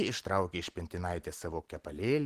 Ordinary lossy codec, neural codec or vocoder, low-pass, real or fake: Opus, 16 kbps; none; 14.4 kHz; real